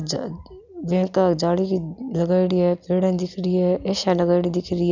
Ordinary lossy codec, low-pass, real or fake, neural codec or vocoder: none; 7.2 kHz; real; none